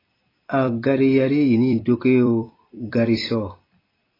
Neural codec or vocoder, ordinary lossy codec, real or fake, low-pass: vocoder, 44.1 kHz, 128 mel bands every 256 samples, BigVGAN v2; AAC, 24 kbps; fake; 5.4 kHz